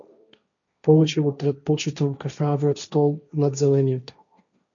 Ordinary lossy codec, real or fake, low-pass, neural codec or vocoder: MP3, 96 kbps; fake; 7.2 kHz; codec, 16 kHz, 1.1 kbps, Voila-Tokenizer